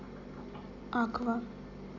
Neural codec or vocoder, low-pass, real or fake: none; 7.2 kHz; real